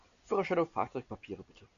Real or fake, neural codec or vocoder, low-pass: real; none; 7.2 kHz